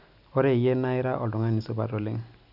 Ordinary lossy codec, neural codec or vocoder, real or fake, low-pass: none; none; real; 5.4 kHz